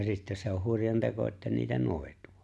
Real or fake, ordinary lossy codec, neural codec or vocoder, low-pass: real; none; none; none